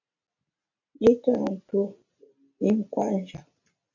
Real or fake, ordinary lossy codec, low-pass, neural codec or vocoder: real; AAC, 48 kbps; 7.2 kHz; none